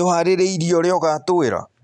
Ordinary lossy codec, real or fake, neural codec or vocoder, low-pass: none; real; none; 10.8 kHz